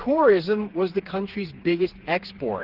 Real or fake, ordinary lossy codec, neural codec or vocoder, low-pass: fake; Opus, 16 kbps; codec, 16 kHz, 4 kbps, FreqCodec, smaller model; 5.4 kHz